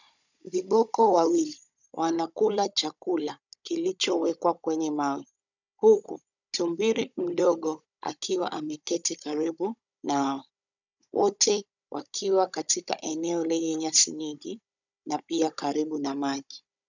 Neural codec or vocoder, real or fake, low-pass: codec, 16 kHz, 16 kbps, FunCodec, trained on Chinese and English, 50 frames a second; fake; 7.2 kHz